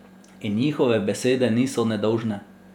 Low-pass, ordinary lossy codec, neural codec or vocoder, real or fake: 19.8 kHz; none; vocoder, 48 kHz, 128 mel bands, Vocos; fake